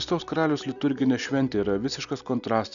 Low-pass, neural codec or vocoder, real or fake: 7.2 kHz; none; real